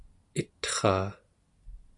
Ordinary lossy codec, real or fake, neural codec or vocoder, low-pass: AAC, 64 kbps; real; none; 10.8 kHz